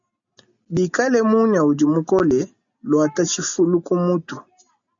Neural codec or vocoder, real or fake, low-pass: none; real; 7.2 kHz